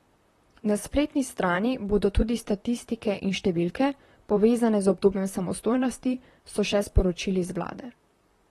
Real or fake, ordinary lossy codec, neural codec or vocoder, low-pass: fake; AAC, 32 kbps; vocoder, 44.1 kHz, 128 mel bands, Pupu-Vocoder; 19.8 kHz